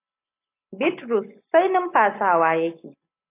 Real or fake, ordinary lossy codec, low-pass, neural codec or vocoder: real; AAC, 24 kbps; 3.6 kHz; none